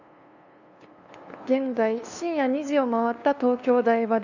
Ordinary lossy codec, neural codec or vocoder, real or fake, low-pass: none; codec, 16 kHz, 2 kbps, FunCodec, trained on LibriTTS, 25 frames a second; fake; 7.2 kHz